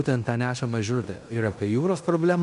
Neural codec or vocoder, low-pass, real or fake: codec, 16 kHz in and 24 kHz out, 0.9 kbps, LongCat-Audio-Codec, fine tuned four codebook decoder; 10.8 kHz; fake